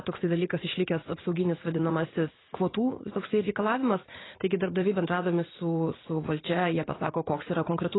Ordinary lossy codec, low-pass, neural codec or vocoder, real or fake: AAC, 16 kbps; 7.2 kHz; vocoder, 44.1 kHz, 80 mel bands, Vocos; fake